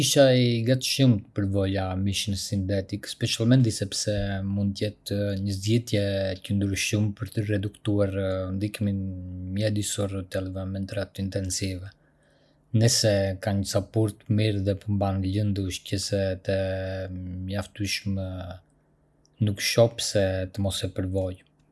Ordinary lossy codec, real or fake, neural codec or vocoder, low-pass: none; real; none; none